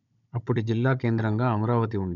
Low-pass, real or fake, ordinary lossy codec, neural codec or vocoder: 7.2 kHz; fake; none; codec, 16 kHz, 16 kbps, FunCodec, trained on Chinese and English, 50 frames a second